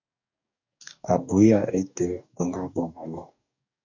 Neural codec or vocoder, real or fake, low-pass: codec, 44.1 kHz, 2.6 kbps, DAC; fake; 7.2 kHz